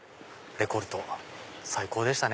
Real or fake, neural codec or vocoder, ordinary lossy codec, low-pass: real; none; none; none